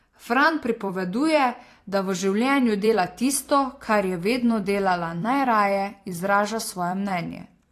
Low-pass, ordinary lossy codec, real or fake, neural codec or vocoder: 14.4 kHz; AAC, 48 kbps; fake; vocoder, 44.1 kHz, 128 mel bands every 256 samples, BigVGAN v2